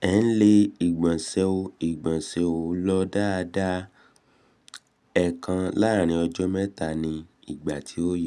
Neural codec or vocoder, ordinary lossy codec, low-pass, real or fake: none; none; none; real